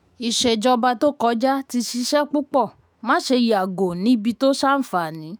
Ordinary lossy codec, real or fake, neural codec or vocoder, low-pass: none; fake; autoencoder, 48 kHz, 128 numbers a frame, DAC-VAE, trained on Japanese speech; none